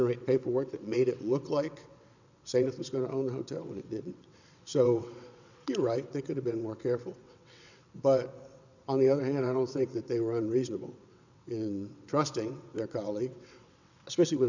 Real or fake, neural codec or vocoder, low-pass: fake; vocoder, 22.05 kHz, 80 mel bands, WaveNeXt; 7.2 kHz